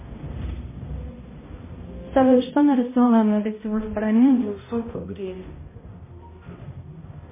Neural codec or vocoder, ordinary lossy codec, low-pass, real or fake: codec, 16 kHz, 0.5 kbps, X-Codec, HuBERT features, trained on balanced general audio; MP3, 16 kbps; 3.6 kHz; fake